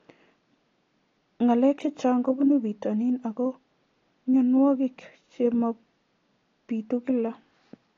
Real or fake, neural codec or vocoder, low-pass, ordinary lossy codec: real; none; 7.2 kHz; AAC, 32 kbps